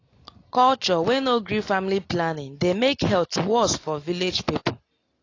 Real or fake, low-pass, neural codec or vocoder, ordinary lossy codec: real; 7.2 kHz; none; AAC, 32 kbps